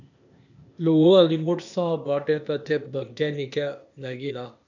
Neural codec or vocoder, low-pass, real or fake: codec, 16 kHz, 0.8 kbps, ZipCodec; 7.2 kHz; fake